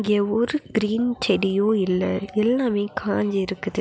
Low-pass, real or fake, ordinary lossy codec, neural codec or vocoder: none; real; none; none